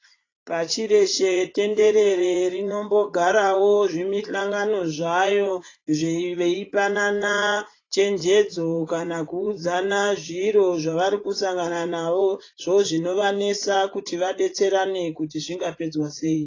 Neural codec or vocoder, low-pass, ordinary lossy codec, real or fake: vocoder, 44.1 kHz, 80 mel bands, Vocos; 7.2 kHz; AAC, 32 kbps; fake